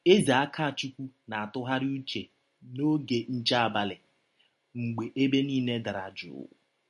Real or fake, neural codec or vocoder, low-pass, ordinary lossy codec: real; none; 10.8 kHz; MP3, 48 kbps